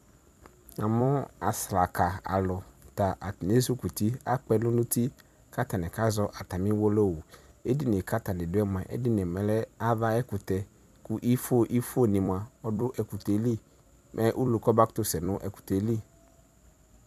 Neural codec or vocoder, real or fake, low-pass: vocoder, 44.1 kHz, 128 mel bands every 256 samples, BigVGAN v2; fake; 14.4 kHz